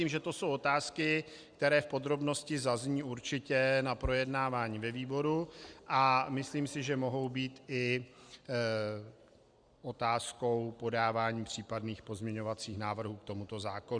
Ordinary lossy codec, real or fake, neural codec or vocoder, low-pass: Opus, 64 kbps; real; none; 9.9 kHz